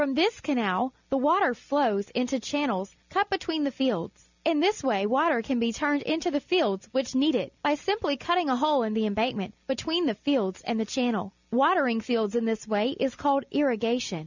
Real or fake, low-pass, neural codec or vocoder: real; 7.2 kHz; none